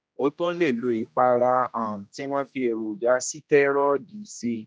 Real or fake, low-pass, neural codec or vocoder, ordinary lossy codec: fake; none; codec, 16 kHz, 1 kbps, X-Codec, HuBERT features, trained on general audio; none